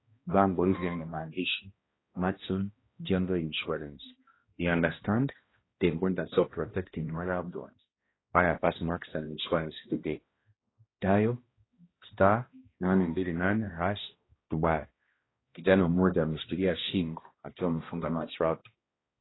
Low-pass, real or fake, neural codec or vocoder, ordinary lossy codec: 7.2 kHz; fake; codec, 16 kHz, 1 kbps, X-Codec, HuBERT features, trained on balanced general audio; AAC, 16 kbps